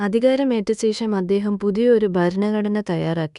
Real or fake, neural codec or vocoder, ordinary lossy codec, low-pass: fake; codec, 24 kHz, 1.2 kbps, DualCodec; none; 10.8 kHz